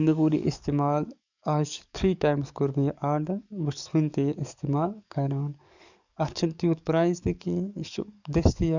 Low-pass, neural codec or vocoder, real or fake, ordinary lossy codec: 7.2 kHz; codec, 44.1 kHz, 7.8 kbps, DAC; fake; none